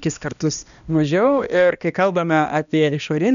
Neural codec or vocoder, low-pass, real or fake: codec, 16 kHz, 1 kbps, X-Codec, HuBERT features, trained on balanced general audio; 7.2 kHz; fake